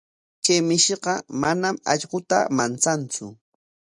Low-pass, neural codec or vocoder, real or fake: 10.8 kHz; none; real